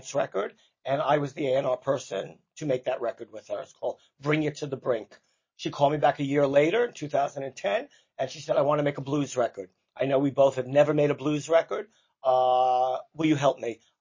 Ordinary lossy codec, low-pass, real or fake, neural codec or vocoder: MP3, 32 kbps; 7.2 kHz; real; none